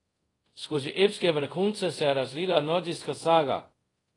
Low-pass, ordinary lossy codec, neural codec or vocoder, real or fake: 10.8 kHz; AAC, 32 kbps; codec, 24 kHz, 0.5 kbps, DualCodec; fake